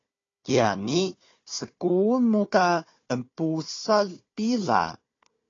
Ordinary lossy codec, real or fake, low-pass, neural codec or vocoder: AAC, 32 kbps; fake; 7.2 kHz; codec, 16 kHz, 4 kbps, FunCodec, trained on Chinese and English, 50 frames a second